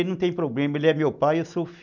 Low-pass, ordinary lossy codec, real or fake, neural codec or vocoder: 7.2 kHz; none; real; none